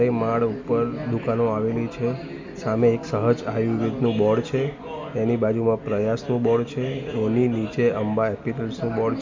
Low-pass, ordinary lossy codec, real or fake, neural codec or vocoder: 7.2 kHz; MP3, 64 kbps; real; none